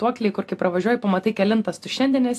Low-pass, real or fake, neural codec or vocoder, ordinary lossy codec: 14.4 kHz; real; none; AAC, 64 kbps